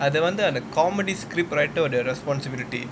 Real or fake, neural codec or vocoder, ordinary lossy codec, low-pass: real; none; none; none